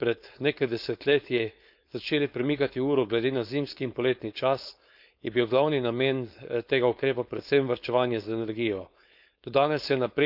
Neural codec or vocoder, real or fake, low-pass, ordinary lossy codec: codec, 16 kHz, 4.8 kbps, FACodec; fake; 5.4 kHz; none